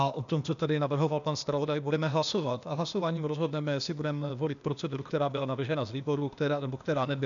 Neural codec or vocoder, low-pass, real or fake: codec, 16 kHz, 0.8 kbps, ZipCodec; 7.2 kHz; fake